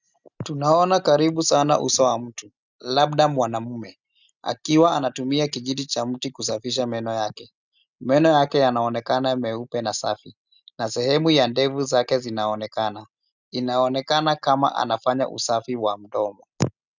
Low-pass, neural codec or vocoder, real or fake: 7.2 kHz; none; real